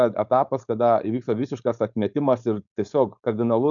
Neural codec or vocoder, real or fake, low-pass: codec, 16 kHz, 4.8 kbps, FACodec; fake; 7.2 kHz